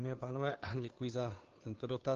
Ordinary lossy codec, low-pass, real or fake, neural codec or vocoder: Opus, 32 kbps; 7.2 kHz; fake; codec, 16 kHz, 2 kbps, FunCodec, trained on Chinese and English, 25 frames a second